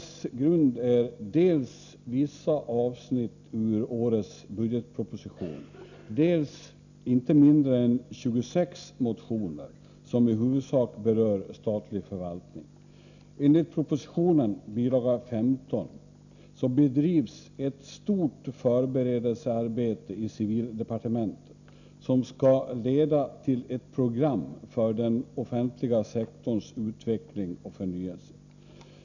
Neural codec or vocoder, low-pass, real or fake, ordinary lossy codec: none; 7.2 kHz; real; none